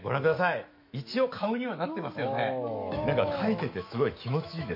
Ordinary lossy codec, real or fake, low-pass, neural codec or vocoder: MP3, 32 kbps; fake; 5.4 kHz; autoencoder, 48 kHz, 128 numbers a frame, DAC-VAE, trained on Japanese speech